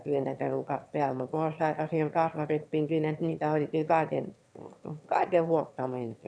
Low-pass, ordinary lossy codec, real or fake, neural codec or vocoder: none; none; fake; autoencoder, 22.05 kHz, a latent of 192 numbers a frame, VITS, trained on one speaker